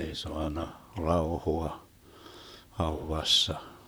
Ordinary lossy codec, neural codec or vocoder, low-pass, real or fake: none; vocoder, 44.1 kHz, 128 mel bands, Pupu-Vocoder; none; fake